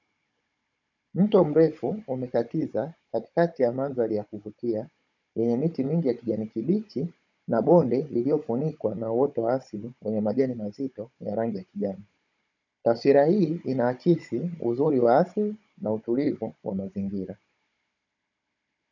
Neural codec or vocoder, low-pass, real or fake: codec, 16 kHz, 16 kbps, FunCodec, trained on Chinese and English, 50 frames a second; 7.2 kHz; fake